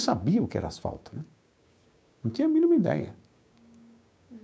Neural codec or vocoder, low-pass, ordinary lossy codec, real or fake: codec, 16 kHz, 6 kbps, DAC; none; none; fake